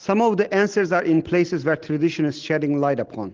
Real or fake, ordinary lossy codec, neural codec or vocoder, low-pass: real; Opus, 16 kbps; none; 7.2 kHz